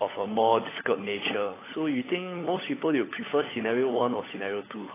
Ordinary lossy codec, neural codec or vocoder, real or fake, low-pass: AAC, 16 kbps; codec, 16 kHz, 16 kbps, FunCodec, trained on LibriTTS, 50 frames a second; fake; 3.6 kHz